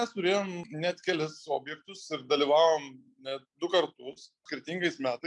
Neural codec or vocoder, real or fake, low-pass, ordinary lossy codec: none; real; 10.8 kHz; MP3, 96 kbps